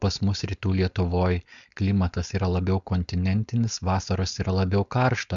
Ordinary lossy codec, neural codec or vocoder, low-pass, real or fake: MP3, 96 kbps; codec, 16 kHz, 4.8 kbps, FACodec; 7.2 kHz; fake